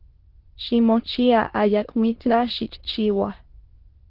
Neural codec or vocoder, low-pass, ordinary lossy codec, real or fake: autoencoder, 22.05 kHz, a latent of 192 numbers a frame, VITS, trained on many speakers; 5.4 kHz; Opus, 16 kbps; fake